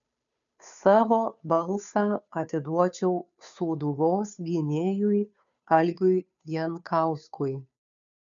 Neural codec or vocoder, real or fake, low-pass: codec, 16 kHz, 2 kbps, FunCodec, trained on Chinese and English, 25 frames a second; fake; 7.2 kHz